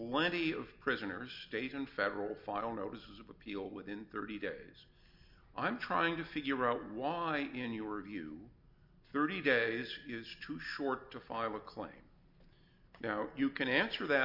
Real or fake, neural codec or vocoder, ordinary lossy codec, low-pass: real; none; MP3, 48 kbps; 5.4 kHz